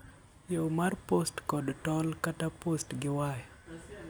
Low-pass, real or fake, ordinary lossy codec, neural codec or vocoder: none; real; none; none